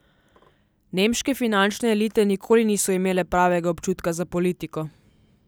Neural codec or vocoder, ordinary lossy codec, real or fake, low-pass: none; none; real; none